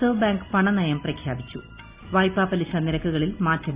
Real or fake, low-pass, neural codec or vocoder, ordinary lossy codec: real; 3.6 kHz; none; Opus, 64 kbps